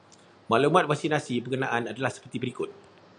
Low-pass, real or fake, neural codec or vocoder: 9.9 kHz; real; none